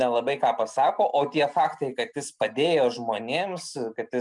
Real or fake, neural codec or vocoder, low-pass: real; none; 10.8 kHz